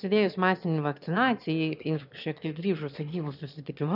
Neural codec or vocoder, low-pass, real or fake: autoencoder, 22.05 kHz, a latent of 192 numbers a frame, VITS, trained on one speaker; 5.4 kHz; fake